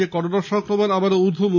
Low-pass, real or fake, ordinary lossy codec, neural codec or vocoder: 7.2 kHz; real; none; none